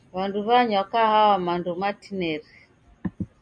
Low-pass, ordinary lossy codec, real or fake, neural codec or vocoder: 9.9 kHz; MP3, 64 kbps; real; none